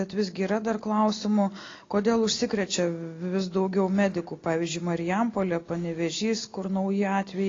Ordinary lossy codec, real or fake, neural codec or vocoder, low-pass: AAC, 32 kbps; real; none; 7.2 kHz